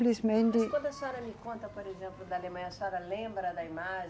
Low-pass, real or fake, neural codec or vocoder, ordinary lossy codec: none; real; none; none